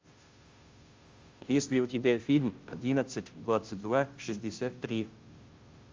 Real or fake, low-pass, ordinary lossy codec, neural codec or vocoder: fake; 7.2 kHz; Opus, 32 kbps; codec, 16 kHz, 0.5 kbps, FunCodec, trained on Chinese and English, 25 frames a second